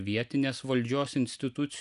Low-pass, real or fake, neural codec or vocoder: 10.8 kHz; real; none